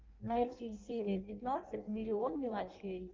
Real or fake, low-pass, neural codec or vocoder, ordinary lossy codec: fake; 7.2 kHz; codec, 16 kHz in and 24 kHz out, 0.6 kbps, FireRedTTS-2 codec; Opus, 24 kbps